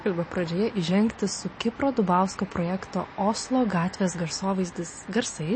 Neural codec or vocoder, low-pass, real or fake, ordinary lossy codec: none; 9.9 kHz; real; MP3, 32 kbps